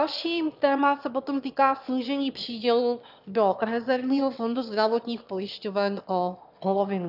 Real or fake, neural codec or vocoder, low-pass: fake; autoencoder, 22.05 kHz, a latent of 192 numbers a frame, VITS, trained on one speaker; 5.4 kHz